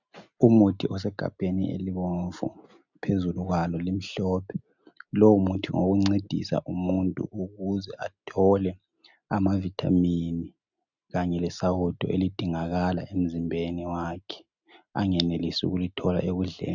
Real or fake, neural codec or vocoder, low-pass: real; none; 7.2 kHz